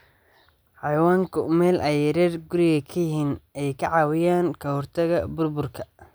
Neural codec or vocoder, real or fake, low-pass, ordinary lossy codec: none; real; none; none